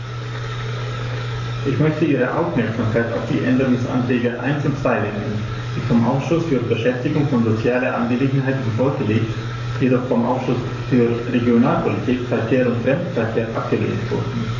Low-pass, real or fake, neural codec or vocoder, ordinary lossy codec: 7.2 kHz; fake; codec, 44.1 kHz, 7.8 kbps, DAC; AAC, 48 kbps